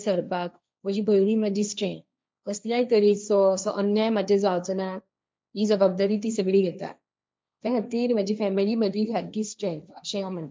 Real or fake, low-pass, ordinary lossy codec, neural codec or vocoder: fake; none; none; codec, 16 kHz, 1.1 kbps, Voila-Tokenizer